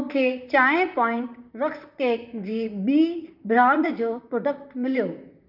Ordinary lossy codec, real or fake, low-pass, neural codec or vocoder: none; fake; 5.4 kHz; vocoder, 44.1 kHz, 128 mel bands, Pupu-Vocoder